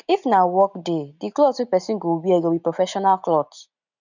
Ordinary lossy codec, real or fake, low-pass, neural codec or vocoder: none; real; 7.2 kHz; none